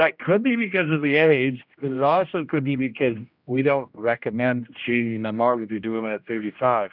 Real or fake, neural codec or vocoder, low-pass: fake; codec, 16 kHz, 1 kbps, X-Codec, HuBERT features, trained on general audio; 5.4 kHz